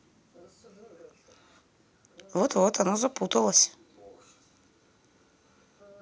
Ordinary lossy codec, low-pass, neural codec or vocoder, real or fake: none; none; none; real